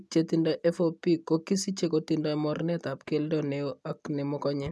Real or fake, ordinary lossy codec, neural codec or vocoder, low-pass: real; none; none; none